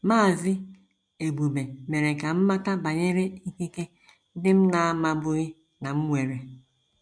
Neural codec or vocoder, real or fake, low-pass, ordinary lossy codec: none; real; 9.9 kHz; MP3, 64 kbps